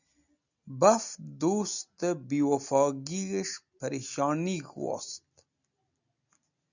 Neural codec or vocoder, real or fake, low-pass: none; real; 7.2 kHz